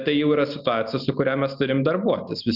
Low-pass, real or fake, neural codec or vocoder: 5.4 kHz; real; none